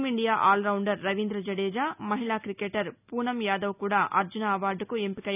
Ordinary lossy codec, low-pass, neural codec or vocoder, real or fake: none; 3.6 kHz; none; real